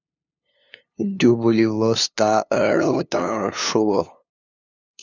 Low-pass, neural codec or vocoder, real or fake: 7.2 kHz; codec, 16 kHz, 2 kbps, FunCodec, trained on LibriTTS, 25 frames a second; fake